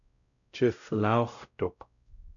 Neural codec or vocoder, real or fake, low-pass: codec, 16 kHz, 0.5 kbps, X-Codec, WavLM features, trained on Multilingual LibriSpeech; fake; 7.2 kHz